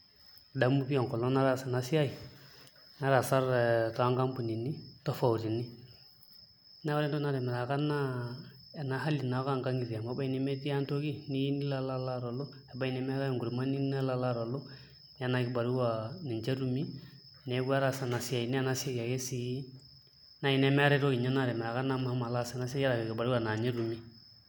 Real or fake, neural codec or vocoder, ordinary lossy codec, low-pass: real; none; none; none